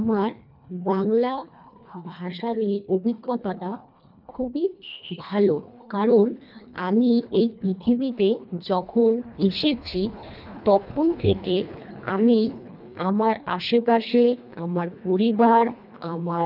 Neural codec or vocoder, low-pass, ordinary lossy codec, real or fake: codec, 24 kHz, 1.5 kbps, HILCodec; 5.4 kHz; none; fake